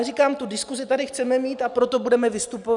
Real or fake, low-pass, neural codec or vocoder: real; 10.8 kHz; none